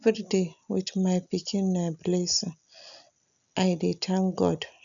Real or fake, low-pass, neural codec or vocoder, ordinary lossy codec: real; 7.2 kHz; none; none